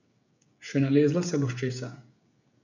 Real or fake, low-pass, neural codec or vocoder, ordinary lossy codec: fake; 7.2 kHz; codec, 16 kHz, 16 kbps, FreqCodec, smaller model; none